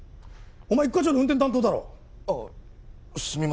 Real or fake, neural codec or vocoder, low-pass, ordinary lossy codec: real; none; none; none